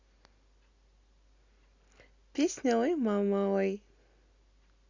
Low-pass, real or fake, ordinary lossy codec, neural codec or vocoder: 7.2 kHz; real; Opus, 64 kbps; none